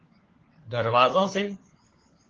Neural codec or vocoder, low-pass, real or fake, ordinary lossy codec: codec, 16 kHz, 4 kbps, FunCodec, trained on LibriTTS, 50 frames a second; 7.2 kHz; fake; Opus, 16 kbps